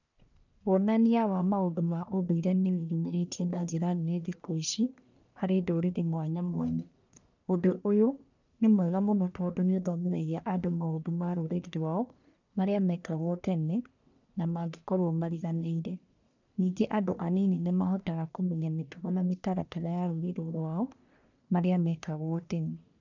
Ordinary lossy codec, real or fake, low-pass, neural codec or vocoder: none; fake; 7.2 kHz; codec, 44.1 kHz, 1.7 kbps, Pupu-Codec